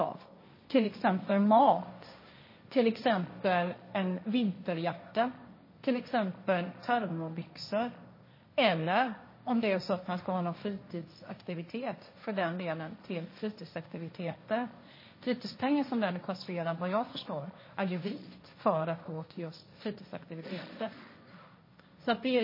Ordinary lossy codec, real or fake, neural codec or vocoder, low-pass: MP3, 24 kbps; fake; codec, 16 kHz, 1.1 kbps, Voila-Tokenizer; 5.4 kHz